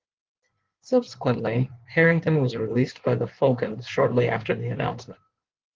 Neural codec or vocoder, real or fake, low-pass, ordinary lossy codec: codec, 16 kHz in and 24 kHz out, 1.1 kbps, FireRedTTS-2 codec; fake; 7.2 kHz; Opus, 16 kbps